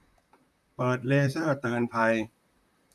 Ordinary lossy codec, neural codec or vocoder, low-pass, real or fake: none; vocoder, 44.1 kHz, 128 mel bands, Pupu-Vocoder; 14.4 kHz; fake